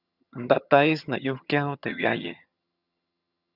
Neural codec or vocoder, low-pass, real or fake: vocoder, 22.05 kHz, 80 mel bands, HiFi-GAN; 5.4 kHz; fake